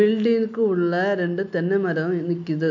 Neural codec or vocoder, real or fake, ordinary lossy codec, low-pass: none; real; MP3, 48 kbps; 7.2 kHz